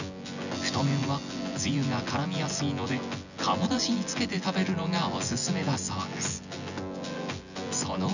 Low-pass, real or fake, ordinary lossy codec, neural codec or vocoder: 7.2 kHz; fake; none; vocoder, 24 kHz, 100 mel bands, Vocos